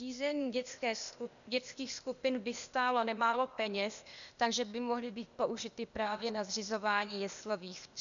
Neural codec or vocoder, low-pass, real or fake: codec, 16 kHz, 0.8 kbps, ZipCodec; 7.2 kHz; fake